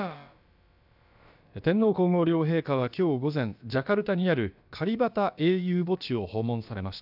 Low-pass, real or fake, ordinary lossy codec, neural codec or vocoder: 5.4 kHz; fake; none; codec, 16 kHz, about 1 kbps, DyCAST, with the encoder's durations